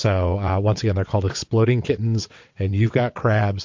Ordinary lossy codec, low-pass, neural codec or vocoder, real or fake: MP3, 48 kbps; 7.2 kHz; vocoder, 22.05 kHz, 80 mel bands, WaveNeXt; fake